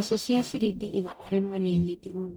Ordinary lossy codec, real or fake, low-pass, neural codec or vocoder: none; fake; none; codec, 44.1 kHz, 0.9 kbps, DAC